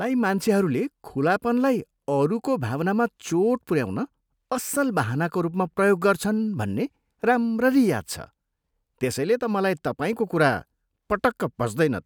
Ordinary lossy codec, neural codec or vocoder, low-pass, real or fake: none; none; none; real